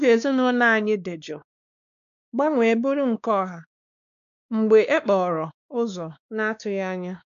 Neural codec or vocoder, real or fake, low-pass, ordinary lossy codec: codec, 16 kHz, 2 kbps, X-Codec, WavLM features, trained on Multilingual LibriSpeech; fake; 7.2 kHz; none